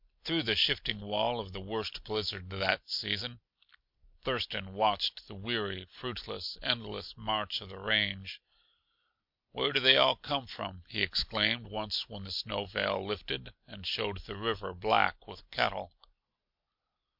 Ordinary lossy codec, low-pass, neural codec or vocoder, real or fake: MP3, 48 kbps; 5.4 kHz; none; real